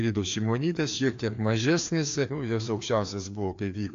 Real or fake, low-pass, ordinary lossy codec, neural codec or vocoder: fake; 7.2 kHz; AAC, 48 kbps; codec, 16 kHz, 2 kbps, FreqCodec, larger model